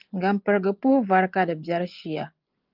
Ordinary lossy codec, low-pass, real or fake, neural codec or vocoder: Opus, 24 kbps; 5.4 kHz; fake; vocoder, 24 kHz, 100 mel bands, Vocos